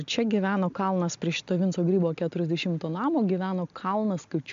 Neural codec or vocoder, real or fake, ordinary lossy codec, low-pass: none; real; AAC, 64 kbps; 7.2 kHz